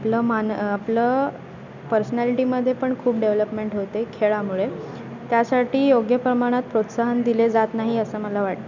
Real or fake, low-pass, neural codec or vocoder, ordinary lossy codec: real; 7.2 kHz; none; none